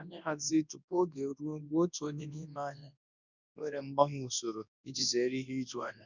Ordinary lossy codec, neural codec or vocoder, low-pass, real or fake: none; codec, 24 kHz, 0.9 kbps, WavTokenizer, large speech release; 7.2 kHz; fake